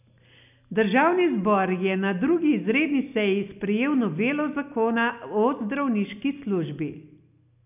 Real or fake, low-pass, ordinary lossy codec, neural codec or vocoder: real; 3.6 kHz; none; none